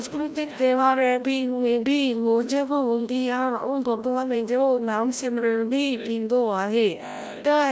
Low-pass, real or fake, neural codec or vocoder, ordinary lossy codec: none; fake; codec, 16 kHz, 0.5 kbps, FreqCodec, larger model; none